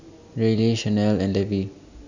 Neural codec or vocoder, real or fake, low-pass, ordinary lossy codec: none; real; 7.2 kHz; none